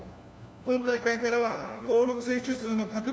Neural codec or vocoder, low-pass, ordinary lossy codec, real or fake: codec, 16 kHz, 1 kbps, FunCodec, trained on LibriTTS, 50 frames a second; none; none; fake